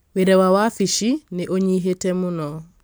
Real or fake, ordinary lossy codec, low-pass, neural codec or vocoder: fake; none; none; vocoder, 44.1 kHz, 128 mel bands every 256 samples, BigVGAN v2